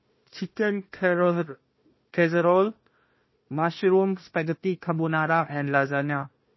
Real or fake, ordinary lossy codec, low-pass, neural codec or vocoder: fake; MP3, 24 kbps; 7.2 kHz; codec, 16 kHz, 1 kbps, FunCodec, trained on Chinese and English, 50 frames a second